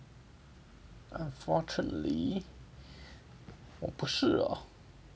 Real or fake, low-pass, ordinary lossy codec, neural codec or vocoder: real; none; none; none